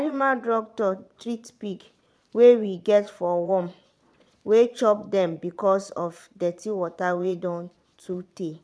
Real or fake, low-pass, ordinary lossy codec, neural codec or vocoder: fake; none; none; vocoder, 22.05 kHz, 80 mel bands, Vocos